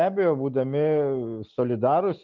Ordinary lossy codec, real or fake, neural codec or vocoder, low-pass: Opus, 32 kbps; real; none; 7.2 kHz